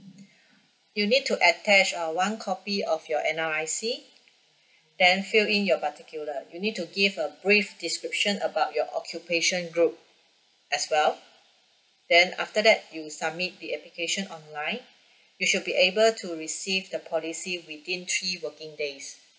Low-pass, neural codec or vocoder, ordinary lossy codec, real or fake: none; none; none; real